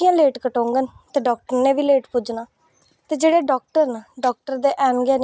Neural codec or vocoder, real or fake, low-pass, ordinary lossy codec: none; real; none; none